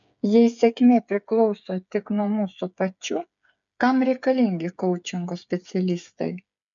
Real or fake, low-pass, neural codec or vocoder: fake; 7.2 kHz; codec, 16 kHz, 8 kbps, FreqCodec, smaller model